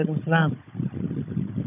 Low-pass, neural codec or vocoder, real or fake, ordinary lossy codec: 3.6 kHz; codec, 16 kHz, 16 kbps, FunCodec, trained on Chinese and English, 50 frames a second; fake; none